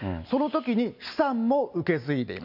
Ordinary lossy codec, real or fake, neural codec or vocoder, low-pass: AAC, 48 kbps; real; none; 5.4 kHz